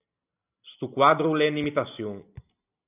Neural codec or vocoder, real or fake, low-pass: none; real; 3.6 kHz